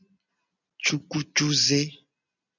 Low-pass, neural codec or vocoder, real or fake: 7.2 kHz; none; real